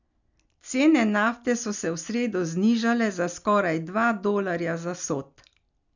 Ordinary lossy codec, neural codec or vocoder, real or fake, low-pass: none; none; real; 7.2 kHz